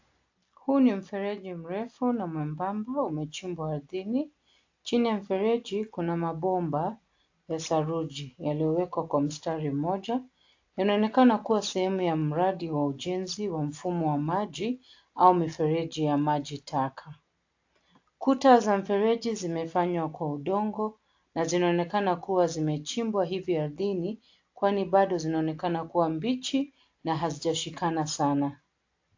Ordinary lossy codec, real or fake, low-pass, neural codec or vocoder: AAC, 48 kbps; real; 7.2 kHz; none